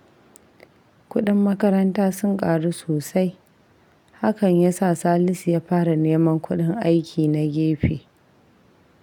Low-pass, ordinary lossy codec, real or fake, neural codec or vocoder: 19.8 kHz; none; real; none